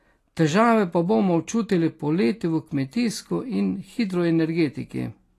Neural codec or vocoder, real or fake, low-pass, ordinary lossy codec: none; real; 14.4 kHz; AAC, 48 kbps